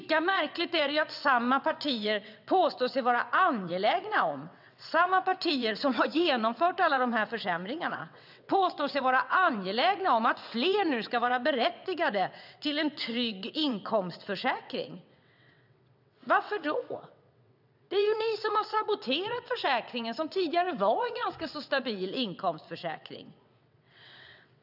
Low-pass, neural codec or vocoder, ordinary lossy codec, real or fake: 5.4 kHz; vocoder, 22.05 kHz, 80 mel bands, WaveNeXt; none; fake